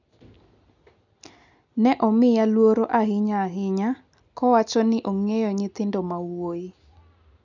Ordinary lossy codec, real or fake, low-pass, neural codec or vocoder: none; real; 7.2 kHz; none